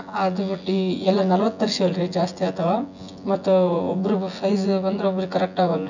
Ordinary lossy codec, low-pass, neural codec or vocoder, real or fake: none; 7.2 kHz; vocoder, 24 kHz, 100 mel bands, Vocos; fake